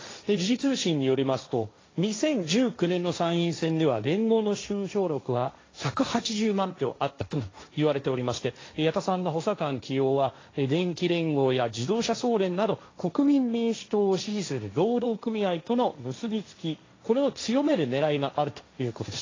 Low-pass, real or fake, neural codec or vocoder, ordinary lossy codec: 7.2 kHz; fake; codec, 16 kHz, 1.1 kbps, Voila-Tokenizer; AAC, 32 kbps